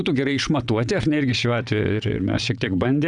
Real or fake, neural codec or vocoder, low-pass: fake; vocoder, 22.05 kHz, 80 mel bands, WaveNeXt; 9.9 kHz